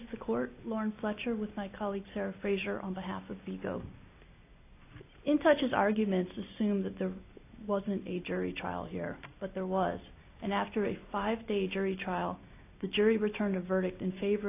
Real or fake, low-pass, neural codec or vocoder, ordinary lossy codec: real; 3.6 kHz; none; AAC, 24 kbps